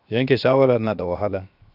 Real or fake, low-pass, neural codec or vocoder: fake; 5.4 kHz; codec, 16 kHz, 0.7 kbps, FocalCodec